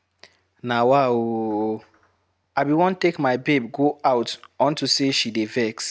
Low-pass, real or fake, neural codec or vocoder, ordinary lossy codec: none; real; none; none